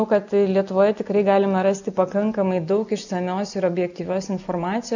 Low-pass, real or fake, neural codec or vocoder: 7.2 kHz; real; none